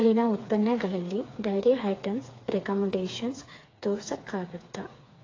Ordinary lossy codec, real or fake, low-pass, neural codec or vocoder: AAC, 32 kbps; fake; 7.2 kHz; codec, 16 kHz, 4 kbps, FreqCodec, smaller model